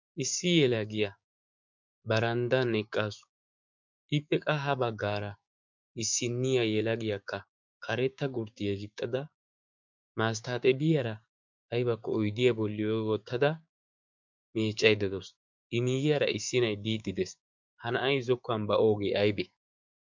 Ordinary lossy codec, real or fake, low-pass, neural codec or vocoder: MP3, 64 kbps; fake; 7.2 kHz; codec, 16 kHz, 6 kbps, DAC